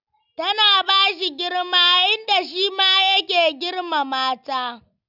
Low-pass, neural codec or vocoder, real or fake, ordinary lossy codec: 5.4 kHz; none; real; none